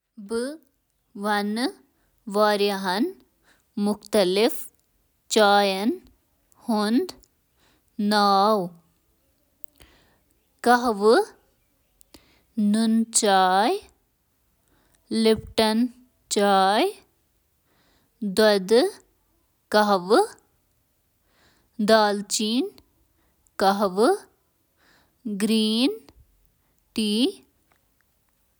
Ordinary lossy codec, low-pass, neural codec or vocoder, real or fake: none; none; none; real